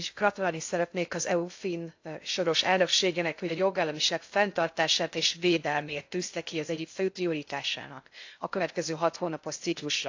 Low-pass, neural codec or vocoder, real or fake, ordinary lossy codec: 7.2 kHz; codec, 16 kHz in and 24 kHz out, 0.6 kbps, FocalCodec, streaming, 2048 codes; fake; AAC, 48 kbps